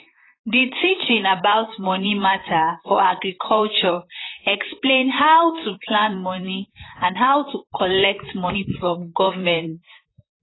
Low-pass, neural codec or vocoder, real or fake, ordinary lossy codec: 7.2 kHz; vocoder, 44.1 kHz, 128 mel bands, Pupu-Vocoder; fake; AAC, 16 kbps